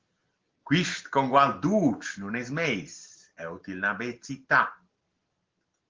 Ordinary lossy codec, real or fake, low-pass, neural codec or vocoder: Opus, 16 kbps; real; 7.2 kHz; none